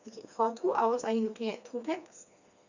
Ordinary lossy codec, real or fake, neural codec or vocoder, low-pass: none; fake; codec, 16 kHz, 2 kbps, FreqCodec, smaller model; 7.2 kHz